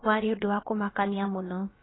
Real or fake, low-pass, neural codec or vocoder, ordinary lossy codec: fake; 7.2 kHz; codec, 16 kHz, about 1 kbps, DyCAST, with the encoder's durations; AAC, 16 kbps